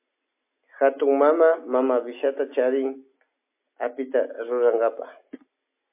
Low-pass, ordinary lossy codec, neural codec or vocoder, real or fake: 3.6 kHz; MP3, 24 kbps; none; real